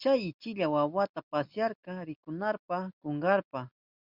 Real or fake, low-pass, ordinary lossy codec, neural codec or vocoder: real; 5.4 kHz; Opus, 64 kbps; none